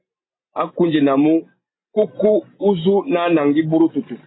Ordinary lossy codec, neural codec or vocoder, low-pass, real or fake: AAC, 16 kbps; none; 7.2 kHz; real